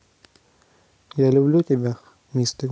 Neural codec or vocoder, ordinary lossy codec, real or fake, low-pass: none; none; real; none